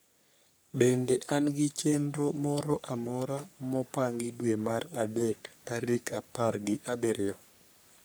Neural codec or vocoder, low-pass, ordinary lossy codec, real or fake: codec, 44.1 kHz, 3.4 kbps, Pupu-Codec; none; none; fake